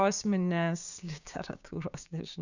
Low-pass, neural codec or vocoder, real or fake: 7.2 kHz; codec, 16 kHz, 6 kbps, DAC; fake